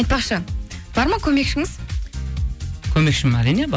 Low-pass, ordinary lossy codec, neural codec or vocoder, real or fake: none; none; none; real